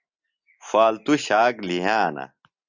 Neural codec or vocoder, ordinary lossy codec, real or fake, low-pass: none; Opus, 64 kbps; real; 7.2 kHz